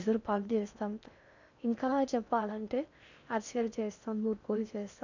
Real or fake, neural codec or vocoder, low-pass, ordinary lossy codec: fake; codec, 16 kHz in and 24 kHz out, 0.6 kbps, FocalCodec, streaming, 4096 codes; 7.2 kHz; none